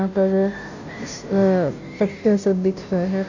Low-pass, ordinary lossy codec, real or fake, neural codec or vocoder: 7.2 kHz; none; fake; codec, 16 kHz, 0.5 kbps, FunCodec, trained on Chinese and English, 25 frames a second